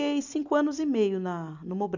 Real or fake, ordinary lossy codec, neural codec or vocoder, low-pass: real; none; none; 7.2 kHz